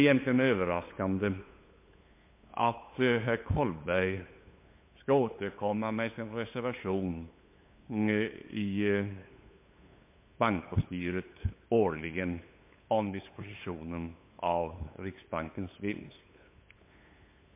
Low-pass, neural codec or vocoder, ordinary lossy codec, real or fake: 3.6 kHz; codec, 16 kHz, 8 kbps, FunCodec, trained on LibriTTS, 25 frames a second; MP3, 24 kbps; fake